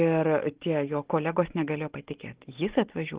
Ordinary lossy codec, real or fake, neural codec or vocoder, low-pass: Opus, 16 kbps; real; none; 3.6 kHz